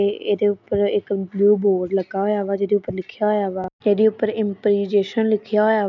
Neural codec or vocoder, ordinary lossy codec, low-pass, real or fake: none; none; 7.2 kHz; real